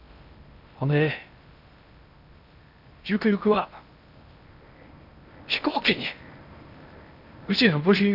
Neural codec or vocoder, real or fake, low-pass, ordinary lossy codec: codec, 16 kHz in and 24 kHz out, 0.8 kbps, FocalCodec, streaming, 65536 codes; fake; 5.4 kHz; none